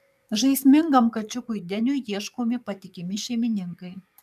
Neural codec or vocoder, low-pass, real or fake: codec, 44.1 kHz, 7.8 kbps, DAC; 14.4 kHz; fake